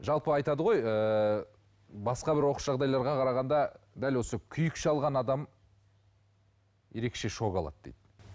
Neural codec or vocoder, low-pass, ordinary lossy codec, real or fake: none; none; none; real